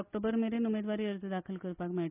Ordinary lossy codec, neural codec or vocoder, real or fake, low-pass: none; none; real; 3.6 kHz